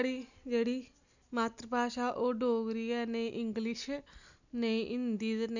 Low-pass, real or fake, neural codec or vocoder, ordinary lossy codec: 7.2 kHz; real; none; none